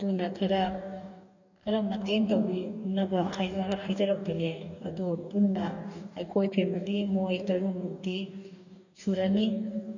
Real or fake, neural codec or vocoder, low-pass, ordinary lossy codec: fake; codec, 44.1 kHz, 2.6 kbps, DAC; 7.2 kHz; none